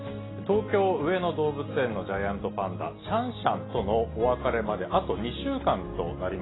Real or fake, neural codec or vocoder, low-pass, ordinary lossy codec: real; none; 7.2 kHz; AAC, 16 kbps